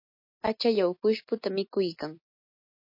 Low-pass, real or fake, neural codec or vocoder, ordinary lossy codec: 5.4 kHz; real; none; MP3, 32 kbps